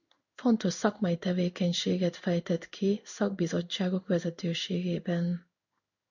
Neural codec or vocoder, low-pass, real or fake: codec, 16 kHz in and 24 kHz out, 1 kbps, XY-Tokenizer; 7.2 kHz; fake